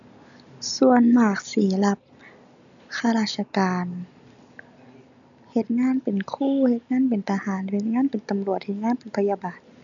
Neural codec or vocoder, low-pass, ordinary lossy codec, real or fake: none; 7.2 kHz; none; real